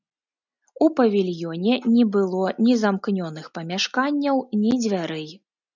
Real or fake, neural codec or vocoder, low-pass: real; none; 7.2 kHz